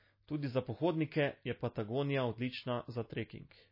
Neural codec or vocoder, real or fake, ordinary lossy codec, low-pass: none; real; MP3, 24 kbps; 5.4 kHz